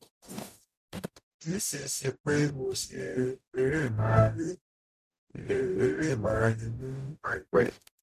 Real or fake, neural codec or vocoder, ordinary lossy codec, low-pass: fake; codec, 44.1 kHz, 0.9 kbps, DAC; none; 14.4 kHz